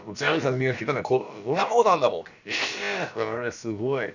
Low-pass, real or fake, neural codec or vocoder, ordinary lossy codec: 7.2 kHz; fake; codec, 16 kHz, about 1 kbps, DyCAST, with the encoder's durations; none